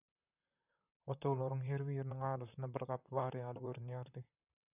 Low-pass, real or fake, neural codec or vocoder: 3.6 kHz; fake; vocoder, 44.1 kHz, 128 mel bands, Pupu-Vocoder